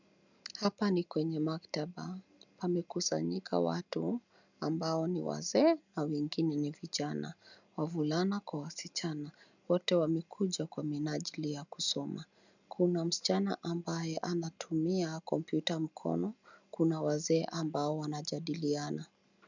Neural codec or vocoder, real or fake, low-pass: none; real; 7.2 kHz